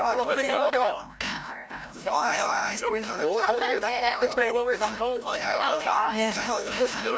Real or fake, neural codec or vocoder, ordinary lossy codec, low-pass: fake; codec, 16 kHz, 0.5 kbps, FreqCodec, larger model; none; none